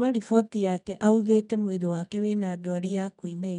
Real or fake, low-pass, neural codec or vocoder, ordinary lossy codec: fake; 10.8 kHz; codec, 24 kHz, 0.9 kbps, WavTokenizer, medium music audio release; none